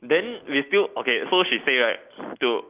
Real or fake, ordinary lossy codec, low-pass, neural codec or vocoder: real; Opus, 24 kbps; 3.6 kHz; none